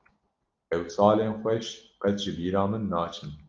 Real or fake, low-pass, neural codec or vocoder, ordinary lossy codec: real; 7.2 kHz; none; Opus, 32 kbps